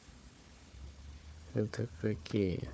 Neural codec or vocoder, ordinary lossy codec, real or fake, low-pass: codec, 16 kHz, 4 kbps, FunCodec, trained on Chinese and English, 50 frames a second; none; fake; none